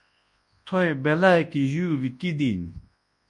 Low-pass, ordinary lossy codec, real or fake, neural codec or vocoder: 10.8 kHz; MP3, 48 kbps; fake; codec, 24 kHz, 0.9 kbps, WavTokenizer, large speech release